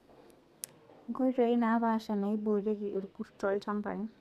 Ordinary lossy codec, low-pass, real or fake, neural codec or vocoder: none; 14.4 kHz; fake; codec, 32 kHz, 1.9 kbps, SNAC